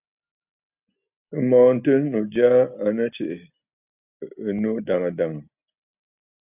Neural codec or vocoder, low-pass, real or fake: none; 3.6 kHz; real